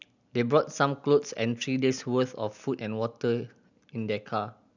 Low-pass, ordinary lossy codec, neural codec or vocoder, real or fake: 7.2 kHz; none; none; real